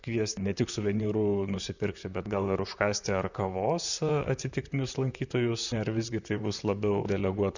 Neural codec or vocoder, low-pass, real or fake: vocoder, 44.1 kHz, 128 mel bands, Pupu-Vocoder; 7.2 kHz; fake